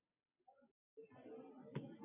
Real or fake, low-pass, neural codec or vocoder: real; 3.6 kHz; none